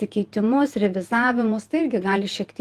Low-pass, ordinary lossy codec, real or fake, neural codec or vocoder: 14.4 kHz; Opus, 24 kbps; fake; vocoder, 48 kHz, 128 mel bands, Vocos